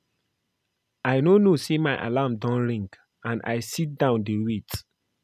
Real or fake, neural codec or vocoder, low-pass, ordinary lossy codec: real; none; 14.4 kHz; none